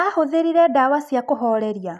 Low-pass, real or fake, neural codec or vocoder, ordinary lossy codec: none; real; none; none